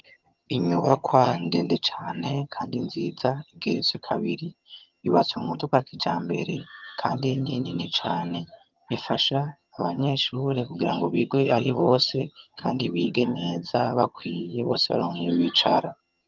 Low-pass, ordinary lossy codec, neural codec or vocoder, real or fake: 7.2 kHz; Opus, 24 kbps; vocoder, 22.05 kHz, 80 mel bands, HiFi-GAN; fake